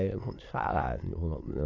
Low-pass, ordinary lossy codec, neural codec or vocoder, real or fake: 7.2 kHz; AAC, 32 kbps; autoencoder, 22.05 kHz, a latent of 192 numbers a frame, VITS, trained on many speakers; fake